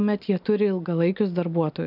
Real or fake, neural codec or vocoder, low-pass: real; none; 5.4 kHz